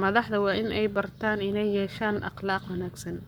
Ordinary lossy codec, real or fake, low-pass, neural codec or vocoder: none; fake; none; codec, 44.1 kHz, 7.8 kbps, Pupu-Codec